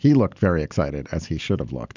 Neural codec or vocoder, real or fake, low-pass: none; real; 7.2 kHz